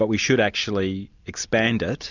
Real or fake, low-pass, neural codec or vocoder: real; 7.2 kHz; none